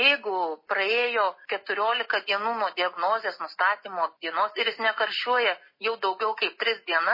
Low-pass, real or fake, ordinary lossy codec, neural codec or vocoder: 5.4 kHz; real; MP3, 24 kbps; none